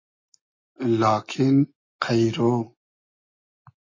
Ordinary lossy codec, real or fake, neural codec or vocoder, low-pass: MP3, 32 kbps; real; none; 7.2 kHz